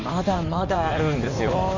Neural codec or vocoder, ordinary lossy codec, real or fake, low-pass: codec, 16 kHz in and 24 kHz out, 2.2 kbps, FireRedTTS-2 codec; AAC, 48 kbps; fake; 7.2 kHz